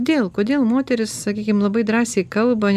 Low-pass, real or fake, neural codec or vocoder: 14.4 kHz; real; none